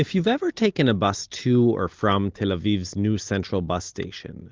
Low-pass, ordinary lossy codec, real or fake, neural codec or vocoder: 7.2 kHz; Opus, 32 kbps; real; none